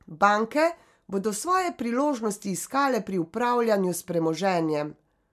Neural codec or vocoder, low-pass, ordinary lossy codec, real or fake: none; 14.4 kHz; MP3, 96 kbps; real